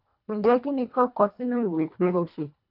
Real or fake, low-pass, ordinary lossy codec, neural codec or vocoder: fake; 5.4 kHz; none; codec, 24 kHz, 1.5 kbps, HILCodec